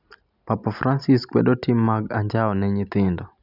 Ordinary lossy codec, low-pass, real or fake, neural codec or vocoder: none; 5.4 kHz; real; none